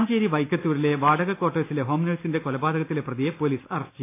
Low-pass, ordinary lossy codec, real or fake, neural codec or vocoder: 3.6 kHz; AAC, 24 kbps; real; none